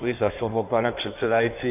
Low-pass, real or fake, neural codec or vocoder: 3.6 kHz; fake; codec, 16 kHz in and 24 kHz out, 1.1 kbps, FireRedTTS-2 codec